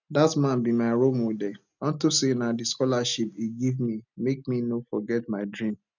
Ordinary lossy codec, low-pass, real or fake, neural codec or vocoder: none; 7.2 kHz; real; none